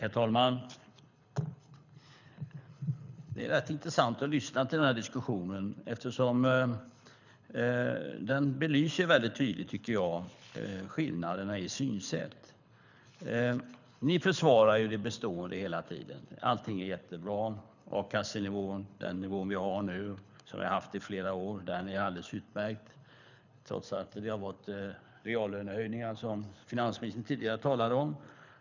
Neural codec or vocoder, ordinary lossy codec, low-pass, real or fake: codec, 24 kHz, 6 kbps, HILCodec; none; 7.2 kHz; fake